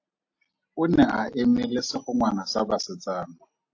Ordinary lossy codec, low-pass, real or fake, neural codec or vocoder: AAC, 48 kbps; 7.2 kHz; real; none